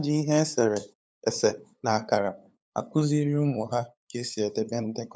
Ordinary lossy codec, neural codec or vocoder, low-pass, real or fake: none; codec, 16 kHz, 8 kbps, FunCodec, trained on LibriTTS, 25 frames a second; none; fake